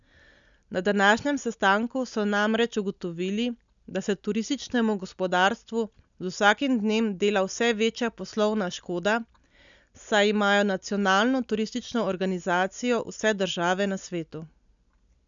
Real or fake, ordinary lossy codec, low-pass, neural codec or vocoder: real; none; 7.2 kHz; none